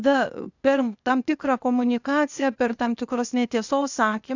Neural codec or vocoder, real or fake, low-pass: codec, 16 kHz, 0.8 kbps, ZipCodec; fake; 7.2 kHz